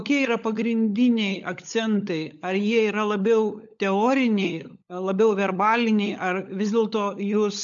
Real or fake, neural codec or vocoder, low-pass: fake; codec, 16 kHz, 16 kbps, FunCodec, trained on LibriTTS, 50 frames a second; 7.2 kHz